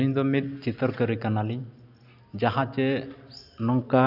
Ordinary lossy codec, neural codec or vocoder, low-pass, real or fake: none; none; 5.4 kHz; real